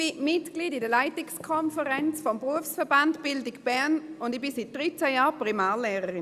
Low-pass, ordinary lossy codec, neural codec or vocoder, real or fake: 14.4 kHz; Opus, 64 kbps; none; real